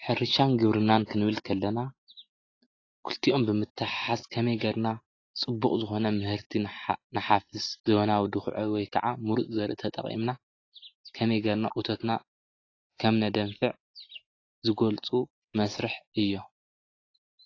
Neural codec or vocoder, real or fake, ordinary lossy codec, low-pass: none; real; AAC, 32 kbps; 7.2 kHz